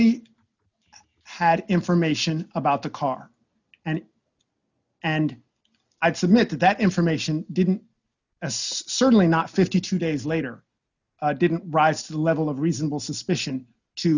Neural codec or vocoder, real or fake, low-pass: none; real; 7.2 kHz